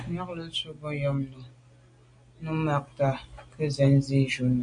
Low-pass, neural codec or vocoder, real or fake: 9.9 kHz; none; real